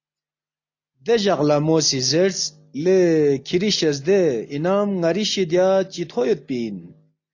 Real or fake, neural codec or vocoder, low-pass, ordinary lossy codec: real; none; 7.2 kHz; AAC, 48 kbps